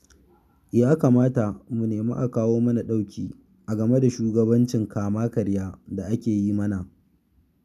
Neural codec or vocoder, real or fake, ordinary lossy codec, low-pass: none; real; none; 14.4 kHz